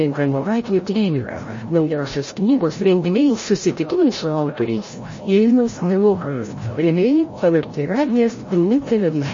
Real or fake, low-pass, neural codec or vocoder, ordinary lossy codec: fake; 7.2 kHz; codec, 16 kHz, 0.5 kbps, FreqCodec, larger model; MP3, 32 kbps